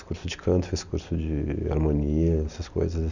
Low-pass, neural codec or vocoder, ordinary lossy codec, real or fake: 7.2 kHz; none; none; real